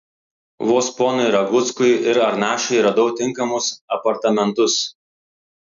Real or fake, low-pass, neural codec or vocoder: real; 7.2 kHz; none